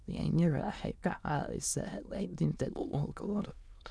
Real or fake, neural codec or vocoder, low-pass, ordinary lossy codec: fake; autoencoder, 22.05 kHz, a latent of 192 numbers a frame, VITS, trained on many speakers; none; none